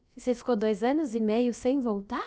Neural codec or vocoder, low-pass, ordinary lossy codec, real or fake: codec, 16 kHz, about 1 kbps, DyCAST, with the encoder's durations; none; none; fake